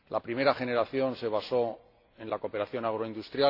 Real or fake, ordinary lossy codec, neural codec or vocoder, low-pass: real; AAC, 32 kbps; none; 5.4 kHz